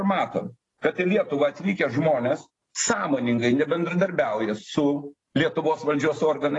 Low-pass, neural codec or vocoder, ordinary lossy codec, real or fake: 10.8 kHz; none; AAC, 32 kbps; real